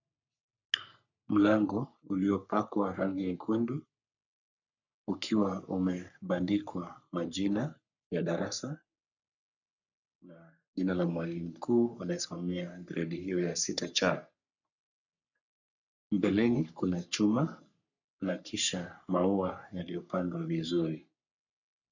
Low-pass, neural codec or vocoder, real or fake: 7.2 kHz; codec, 44.1 kHz, 3.4 kbps, Pupu-Codec; fake